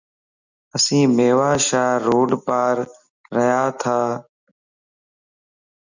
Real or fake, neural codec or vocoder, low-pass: real; none; 7.2 kHz